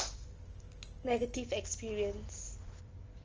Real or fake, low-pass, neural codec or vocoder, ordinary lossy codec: real; 7.2 kHz; none; Opus, 24 kbps